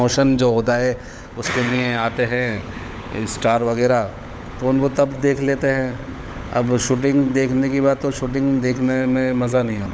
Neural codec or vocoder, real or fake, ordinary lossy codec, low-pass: codec, 16 kHz, 16 kbps, FunCodec, trained on LibriTTS, 50 frames a second; fake; none; none